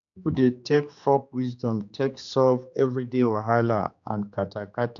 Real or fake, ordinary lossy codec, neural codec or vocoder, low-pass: fake; none; codec, 16 kHz, 2 kbps, X-Codec, HuBERT features, trained on general audio; 7.2 kHz